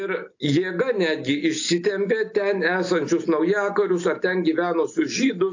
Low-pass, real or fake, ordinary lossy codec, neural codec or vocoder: 7.2 kHz; real; AAC, 48 kbps; none